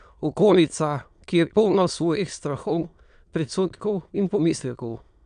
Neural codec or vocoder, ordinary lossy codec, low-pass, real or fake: autoencoder, 22.05 kHz, a latent of 192 numbers a frame, VITS, trained on many speakers; none; 9.9 kHz; fake